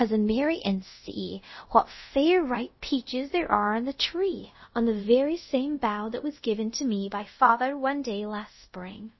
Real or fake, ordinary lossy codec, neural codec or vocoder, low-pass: fake; MP3, 24 kbps; codec, 24 kHz, 0.5 kbps, DualCodec; 7.2 kHz